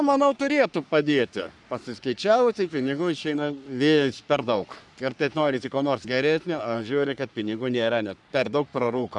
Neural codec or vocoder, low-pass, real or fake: codec, 44.1 kHz, 3.4 kbps, Pupu-Codec; 10.8 kHz; fake